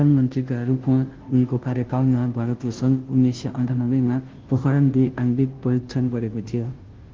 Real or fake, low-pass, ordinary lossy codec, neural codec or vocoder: fake; 7.2 kHz; Opus, 16 kbps; codec, 16 kHz, 0.5 kbps, FunCodec, trained on Chinese and English, 25 frames a second